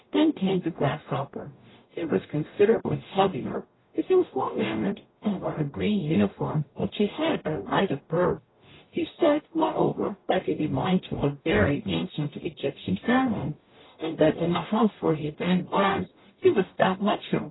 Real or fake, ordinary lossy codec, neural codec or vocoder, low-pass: fake; AAC, 16 kbps; codec, 44.1 kHz, 0.9 kbps, DAC; 7.2 kHz